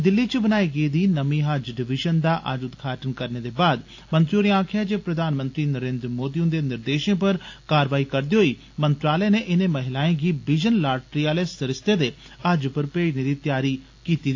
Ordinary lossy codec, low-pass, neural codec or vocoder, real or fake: AAC, 48 kbps; 7.2 kHz; none; real